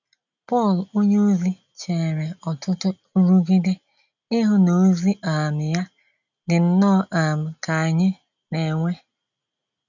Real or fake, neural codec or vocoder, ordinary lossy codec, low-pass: real; none; none; 7.2 kHz